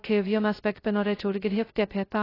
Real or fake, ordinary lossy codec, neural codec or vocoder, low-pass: fake; AAC, 24 kbps; codec, 16 kHz, 0.2 kbps, FocalCodec; 5.4 kHz